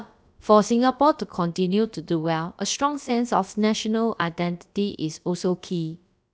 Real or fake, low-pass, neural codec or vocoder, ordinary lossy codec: fake; none; codec, 16 kHz, about 1 kbps, DyCAST, with the encoder's durations; none